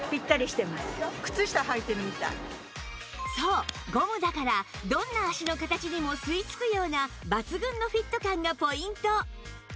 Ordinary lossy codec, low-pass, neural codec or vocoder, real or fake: none; none; none; real